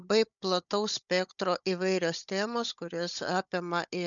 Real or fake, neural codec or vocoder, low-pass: fake; codec, 16 kHz, 16 kbps, FunCodec, trained on Chinese and English, 50 frames a second; 7.2 kHz